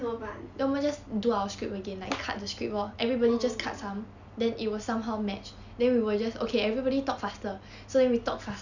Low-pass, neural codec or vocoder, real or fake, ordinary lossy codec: 7.2 kHz; none; real; none